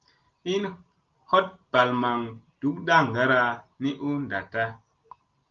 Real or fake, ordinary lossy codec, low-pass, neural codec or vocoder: real; Opus, 32 kbps; 7.2 kHz; none